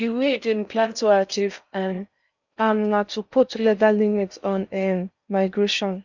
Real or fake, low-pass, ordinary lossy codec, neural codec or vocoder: fake; 7.2 kHz; none; codec, 16 kHz in and 24 kHz out, 0.6 kbps, FocalCodec, streaming, 4096 codes